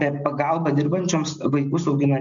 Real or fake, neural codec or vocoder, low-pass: real; none; 7.2 kHz